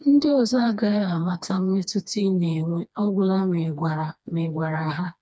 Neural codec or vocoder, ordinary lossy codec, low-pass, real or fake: codec, 16 kHz, 2 kbps, FreqCodec, smaller model; none; none; fake